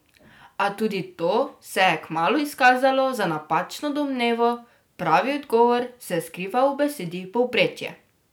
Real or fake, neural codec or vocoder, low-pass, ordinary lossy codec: real; none; none; none